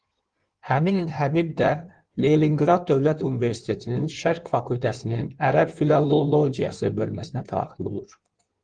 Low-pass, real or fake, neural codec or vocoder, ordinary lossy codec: 9.9 kHz; fake; codec, 16 kHz in and 24 kHz out, 1.1 kbps, FireRedTTS-2 codec; Opus, 24 kbps